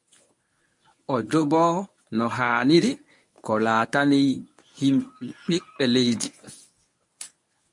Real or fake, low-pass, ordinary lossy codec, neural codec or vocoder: fake; 10.8 kHz; MP3, 64 kbps; codec, 24 kHz, 0.9 kbps, WavTokenizer, medium speech release version 1